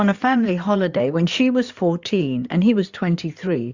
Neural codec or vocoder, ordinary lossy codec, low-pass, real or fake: codec, 16 kHz in and 24 kHz out, 2.2 kbps, FireRedTTS-2 codec; Opus, 64 kbps; 7.2 kHz; fake